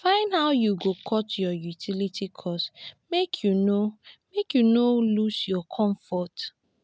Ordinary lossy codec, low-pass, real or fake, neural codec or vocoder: none; none; real; none